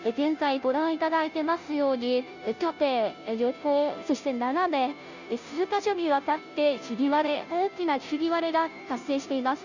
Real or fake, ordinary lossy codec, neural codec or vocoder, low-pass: fake; none; codec, 16 kHz, 0.5 kbps, FunCodec, trained on Chinese and English, 25 frames a second; 7.2 kHz